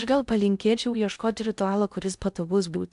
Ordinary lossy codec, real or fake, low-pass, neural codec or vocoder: AAC, 96 kbps; fake; 10.8 kHz; codec, 16 kHz in and 24 kHz out, 0.6 kbps, FocalCodec, streaming, 2048 codes